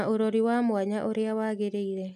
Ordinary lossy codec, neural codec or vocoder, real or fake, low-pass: none; none; real; 14.4 kHz